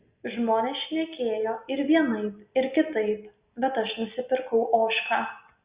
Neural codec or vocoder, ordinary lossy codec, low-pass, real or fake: none; Opus, 64 kbps; 3.6 kHz; real